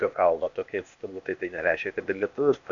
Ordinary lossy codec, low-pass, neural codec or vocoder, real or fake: MP3, 96 kbps; 7.2 kHz; codec, 16 kHz, 0.8 kbps, ZipCodec; fake